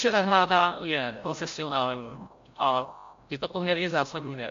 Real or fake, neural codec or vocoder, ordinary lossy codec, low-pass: fake; codec, 16 kHz, 0.5 kbps, FreqCodec, larger model; MP3, 48 kbps; 7.2 kHz